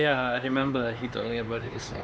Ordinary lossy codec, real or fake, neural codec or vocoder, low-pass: none; fake; codec, 16 kHz, 4 kbps, X-Codec, HuBERT features, trained on LibriSpeech; none